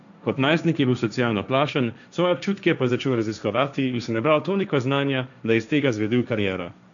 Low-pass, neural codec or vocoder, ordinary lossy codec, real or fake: 7.2 kHz; codec, 16 kHz, 1.1 kbps, Voila-Tokenizer; none; fake